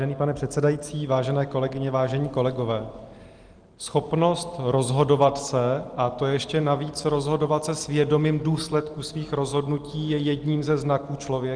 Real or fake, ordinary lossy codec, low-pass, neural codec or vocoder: real; Opus, 24 kbps; 9.9 kHz; none